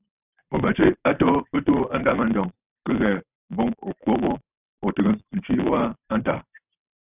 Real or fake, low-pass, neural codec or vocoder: fake; 3.6 kHz; vocoder, 44.1 kHz, 128 mel bands every 512 samples, BigVGAN v2